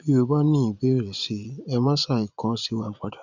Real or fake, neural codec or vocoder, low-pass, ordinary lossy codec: fake; vocoder, 22.05 kHz, 80 mel bands, Vocos; 7.2 kHz; none